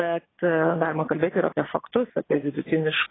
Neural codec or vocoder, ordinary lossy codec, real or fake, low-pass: vocoder, 22.05 kHz, 80 mel bands, Vocos; AAC, 16 kbps; fake; 7.2 kHz